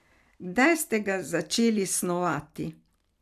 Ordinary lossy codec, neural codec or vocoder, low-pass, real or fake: AAC, 96 kbps; none; 14.4 kHz; real